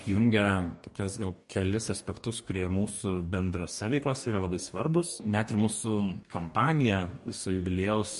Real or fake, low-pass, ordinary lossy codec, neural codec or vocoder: fake; 14.4 kHz; MP3, 48 kbps; codec, 44.1 kHz, 2.6 kbps, DAC